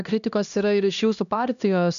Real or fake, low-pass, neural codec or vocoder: fake; 7.2 kHz; codec, 16 kHz, 1 kbps, X-Codec, HuBERT features, trained on LibriSpeech